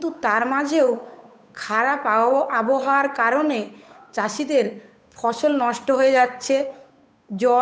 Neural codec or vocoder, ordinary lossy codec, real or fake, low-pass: codec, 16 kHz, 8 kbps, FunCodec, trained on Chinese and English, 25 frames a second; none; fake; none